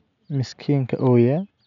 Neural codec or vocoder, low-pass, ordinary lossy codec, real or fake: none; 7.2 kHz; none; real